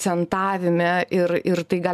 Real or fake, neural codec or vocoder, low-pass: real; none; 14.4 kHz